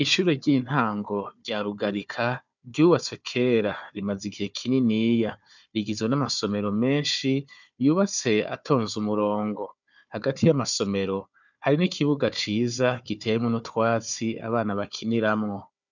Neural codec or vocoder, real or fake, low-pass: codec, 16 kHz, 4 kbps, FunCodec, trained on Chinese and English, 50 frames a second; fake; 7.2 kHz